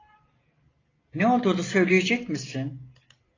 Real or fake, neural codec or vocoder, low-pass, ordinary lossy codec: real; none; 7.2 kHz; AAC, 32 kbps